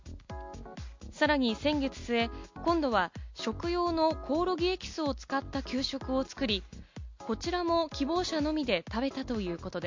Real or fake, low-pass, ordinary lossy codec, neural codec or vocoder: real; 7.2 kHz; MP3, 48 kbps; none